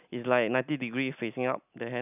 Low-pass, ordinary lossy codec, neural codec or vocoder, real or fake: 3.6 kHz; none; none; real